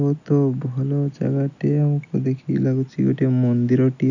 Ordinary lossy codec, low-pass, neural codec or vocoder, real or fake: none; 7.2 kHz; none; real